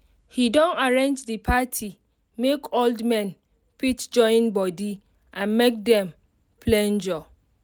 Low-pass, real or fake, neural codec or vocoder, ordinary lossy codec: none; real; none; none